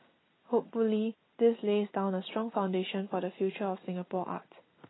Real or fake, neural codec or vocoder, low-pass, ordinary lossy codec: real; none; 7.2 kHz; AAC, 16 kbps